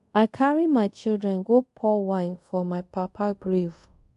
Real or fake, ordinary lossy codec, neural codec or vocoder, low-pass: fake; AAC, 64 kbps; codec, 24 kHz, 0.5 kbps, DualCodec; 10.8 kHz